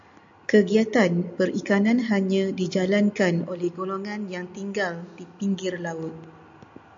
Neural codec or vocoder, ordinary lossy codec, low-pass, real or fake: none; AAC, 64 kbps; 7.2 kHz; real